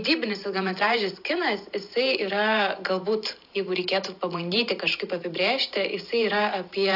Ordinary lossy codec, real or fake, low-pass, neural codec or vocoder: AAC, 48 kbps; fake; 5.4 kHz; vocoder, 44.1 kHz, 128 mel bands every 512 samples, BigVGAN v2